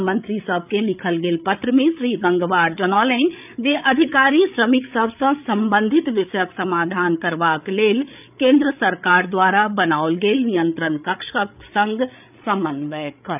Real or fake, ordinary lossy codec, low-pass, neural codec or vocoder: fake; none; 3.6 kHz; codec, 16 kHz, 8 kbps, FreqCodec, larger model